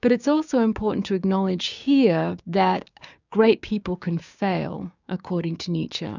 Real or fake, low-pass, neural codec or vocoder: fake; 7.2 kHz; codec, 16 kHz, 6 kbps, DAC